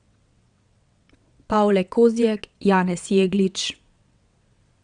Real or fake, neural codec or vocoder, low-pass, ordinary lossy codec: fake; vocoder, 22.05 kHz, 80 mel bands, WaveNeXt; 9.9 kHz; Opus, 64 kbps